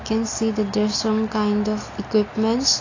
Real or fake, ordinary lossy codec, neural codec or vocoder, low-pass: real; AAC, 32 kbps; none; 7.2 kHz